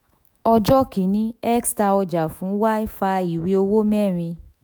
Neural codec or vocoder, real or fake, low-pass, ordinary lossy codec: autoencoder, 48 kHz, 128 numbers a frame, DAC-VAE, trained on Japanese speech; fake; none; none